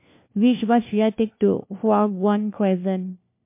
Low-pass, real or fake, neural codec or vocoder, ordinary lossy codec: 3.6 kHz; fake; codec, 16 kHz, 1 kbps, FunCodec, trained on LibriTTS, 50 frames a second; MP3, 24 kbps